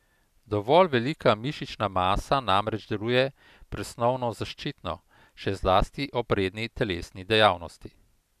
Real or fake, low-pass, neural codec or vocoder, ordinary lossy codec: real; 14.4 kHz; none; none